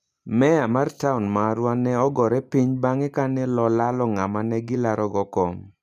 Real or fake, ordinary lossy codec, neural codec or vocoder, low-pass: real; none; none; 14.4 kHz